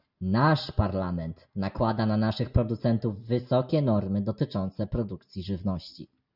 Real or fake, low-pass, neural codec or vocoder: real; 5.4 kHz; none